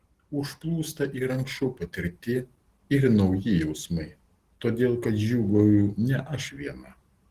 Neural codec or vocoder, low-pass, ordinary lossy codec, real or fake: none; 14.4 kHz; Opus, 16 kbps; real